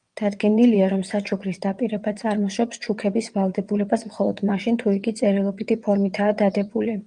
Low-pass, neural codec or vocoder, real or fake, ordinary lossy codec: 9.9 kHz; vocoder, 22.05 kHz, 80 mel bands, WaveNeXt; fake; Opus, 32 kbps